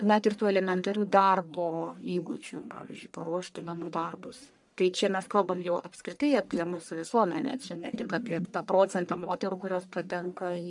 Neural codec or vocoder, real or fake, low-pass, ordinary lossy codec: codec, 44.1 kHz, 1.7 kbps, Pupu-Codec; fake; 10.8 kHz; MP3, 96 kbps